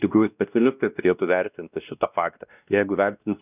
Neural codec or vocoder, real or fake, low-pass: codec, 16 kHz, 1 kbps, X-Codec, WavLM features, trained on Multilingual LibriSpeech; fake; 3.6 kHz